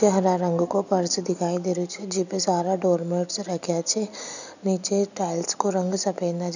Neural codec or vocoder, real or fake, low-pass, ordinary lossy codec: none; real; 7.2 kHz; none